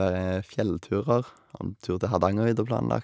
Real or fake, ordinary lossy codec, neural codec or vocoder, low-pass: real; none; none; none